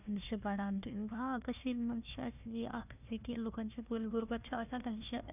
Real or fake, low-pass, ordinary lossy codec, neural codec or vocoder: fake; 3.6 kHz; none; codec, 16 kHz, 1 kbps, FunCodec, trained on Chinese and English, 50 frames a second